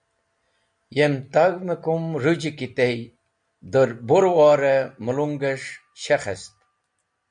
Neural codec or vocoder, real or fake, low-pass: none; real; 9.9 kHz